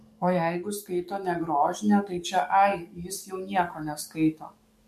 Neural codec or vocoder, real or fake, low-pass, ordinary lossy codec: codec, 44.1 kHz, 7.8 kbps, DAC; fake; 14.4 kHz; MP3, 64 kbps